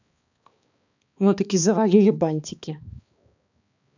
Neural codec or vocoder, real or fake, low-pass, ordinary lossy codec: codec, 16 kHz, 2 kbps, X-Codec, HuBERT features, trained on balanced general audio; fake; 7.2 kHz; none